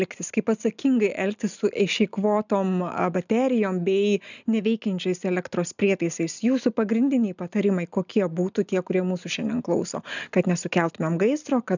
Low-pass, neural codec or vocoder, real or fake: 7.2 kHz; none; real